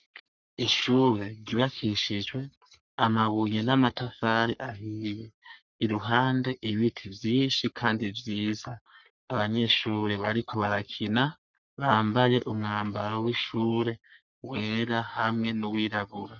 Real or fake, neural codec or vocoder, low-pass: fake; codec, 44.1 kHz, 3.4 kbps, Pupu-Codec; 7.2 kHz